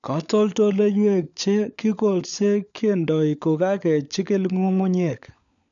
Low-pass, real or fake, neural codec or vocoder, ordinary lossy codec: 7.2 kHz; fake; codec, 16 kHz, 16 kbps, FunCodec, trained on LibriTTS, 50 frames a second; none